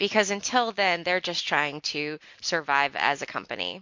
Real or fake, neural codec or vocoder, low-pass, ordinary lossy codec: real; none; 7.2 kHz; MP3, 48 kbps